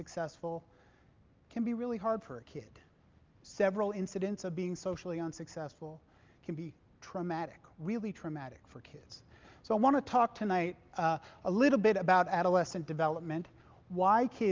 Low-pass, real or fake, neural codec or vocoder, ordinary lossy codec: 7.2 kHz; real; none; Opus, 24 kbps